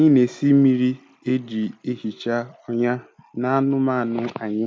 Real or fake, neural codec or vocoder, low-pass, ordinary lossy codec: real; none; none; none